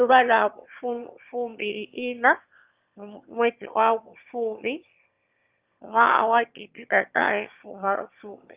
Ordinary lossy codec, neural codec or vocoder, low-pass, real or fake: Opus, 24 kbps; autoencoder, 22.05 kHz, a latent of 192 numbers a frame, VITS, trained on one speaker; 3.6 kHz; fake